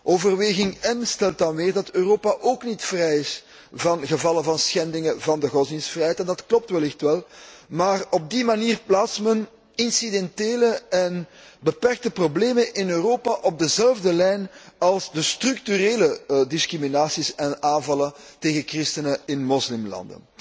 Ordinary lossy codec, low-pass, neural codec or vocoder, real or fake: none; none; none; real